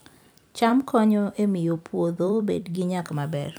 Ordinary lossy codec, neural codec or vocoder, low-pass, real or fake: none; vocoder, 44.1 kHz, 128 mel bands every 256 samples, BigVGAN v2; none; fake